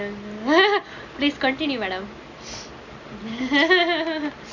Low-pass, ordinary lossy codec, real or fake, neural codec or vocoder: 7.2 kHz; none; real; none